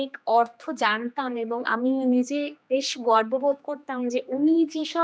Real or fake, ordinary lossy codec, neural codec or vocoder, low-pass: fake; none; codec, 16 kHz, 2 kbps, X-Codec, HuBERT features, trained on general audio; none